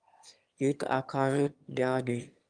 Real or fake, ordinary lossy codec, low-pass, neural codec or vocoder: fake; Opus, 32 kbps; 9.9 kHz; autoencoder, 22.05 kHz, a latent of 192 numbers a frame, VITS, trained on one speaker